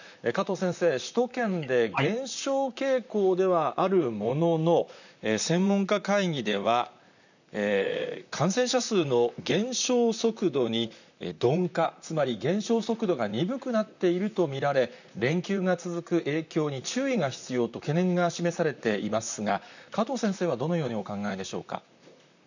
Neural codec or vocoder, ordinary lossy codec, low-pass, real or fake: vocoder, 44.1 kHz, 128 mel bands, Pupu-Vocoder; none; 7.2 kHz; fake